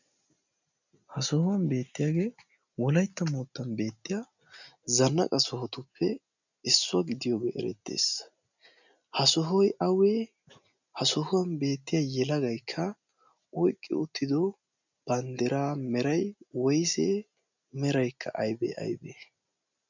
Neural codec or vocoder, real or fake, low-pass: none; real; 7.2 kHz